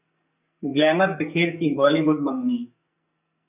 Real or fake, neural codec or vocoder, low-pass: fake; codec, 44.1 kHz, 2.6 kbps, SNAC; 3.6 kHz